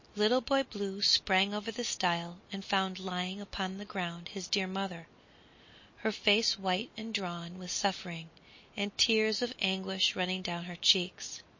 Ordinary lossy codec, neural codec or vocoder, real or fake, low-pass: MP3, 32 kbps; none; real; 7.2 kHz